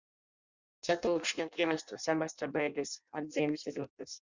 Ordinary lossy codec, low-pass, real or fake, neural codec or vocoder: Opus, 64 kbps; 7.2 kHz; fake; codec, 16 kHz in and 24 kHz out, 0.6 kbps, FireRedTTS-2 codec